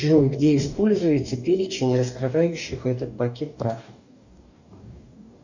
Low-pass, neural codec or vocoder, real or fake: 7.2 kHz; codec, 44.1 kHz, 2.6 kbps, DAC; fake